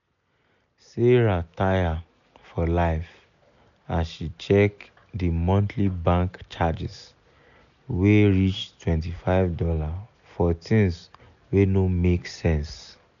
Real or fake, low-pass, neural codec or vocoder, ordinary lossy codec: real; 7.2 kHz; none; none